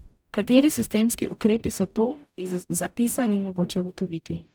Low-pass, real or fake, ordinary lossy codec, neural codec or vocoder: none; fake; none; codec, 44.1 kHz, 0.9 kbps, DAC